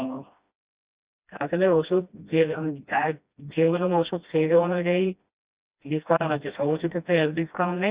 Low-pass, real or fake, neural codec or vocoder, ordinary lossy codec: 3.6 kHz; fake; codec, 16 kHz, 1 kbps, FreqCodec, smaller model; Opus, 64 kbps